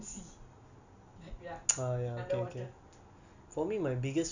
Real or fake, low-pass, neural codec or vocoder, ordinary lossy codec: real; 7.2 kHz; none; none